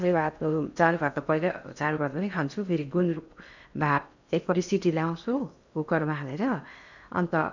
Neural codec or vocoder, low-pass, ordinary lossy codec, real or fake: codec, 16 kHz in and 24 kHz out, 0.6 kbps, FocalCodec, streaming, 4096 codes; 7.2 kHz; none; fake